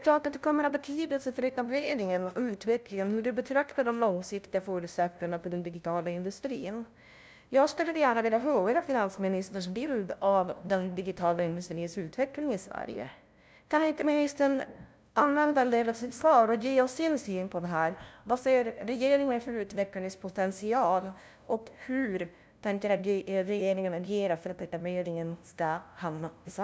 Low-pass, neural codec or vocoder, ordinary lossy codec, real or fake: none; codec, 16 kHz, 0.5 kbps, FunCodec, trained on LibriTTS, 25 frames a second; none; fake